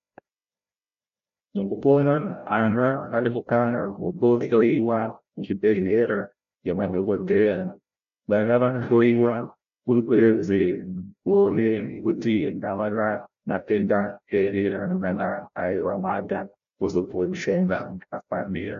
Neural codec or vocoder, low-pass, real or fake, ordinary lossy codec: codec, 16 kHz, 0.5 kbps, FreqCodec, larger model; 7.2 kHz; fake; MP3, 64 kbps